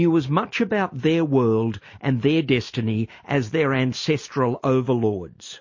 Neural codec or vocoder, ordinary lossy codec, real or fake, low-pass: none; MP3, 32 kbps; real; 7.2 kHz